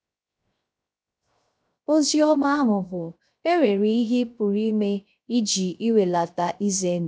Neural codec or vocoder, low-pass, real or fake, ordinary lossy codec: codec, 16 kHz, 0.3 kbps, FocalCodec; none; fake; none